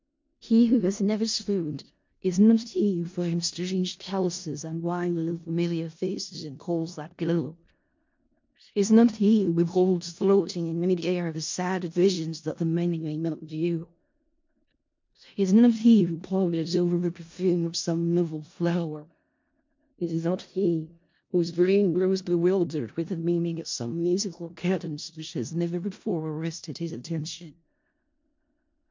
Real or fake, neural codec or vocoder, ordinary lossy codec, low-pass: fake; codec, 16 kHz in and 24 kHz out, 0.4 kbps, LongCat-Audio-Codec, four codebook decoder; MP3, 48 kbps; 7.2 kHz